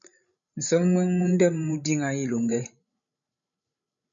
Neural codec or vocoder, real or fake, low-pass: codec, 16 kHz, 16 kbps, FreqCodec, larger model; fake; 7.2 kHz